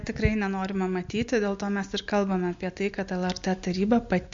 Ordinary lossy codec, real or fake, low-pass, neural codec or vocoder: MP3, 48 kbps; real; 7.2 kHz; none